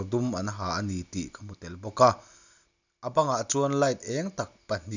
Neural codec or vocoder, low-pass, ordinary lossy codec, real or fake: none; 7.2 kHz; none; real